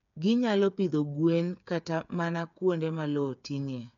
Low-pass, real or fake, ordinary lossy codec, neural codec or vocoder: 7.2 kHz; fake; none; codec, 16 kHz, 8 kbps, FreqCodec, smaller model